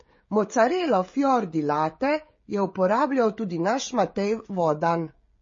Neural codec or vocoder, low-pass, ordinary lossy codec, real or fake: codec, 16 kHz, 16 kbps, FreqCodec, smaller model; 7.2 kHz; MP3, 32 kbps; fake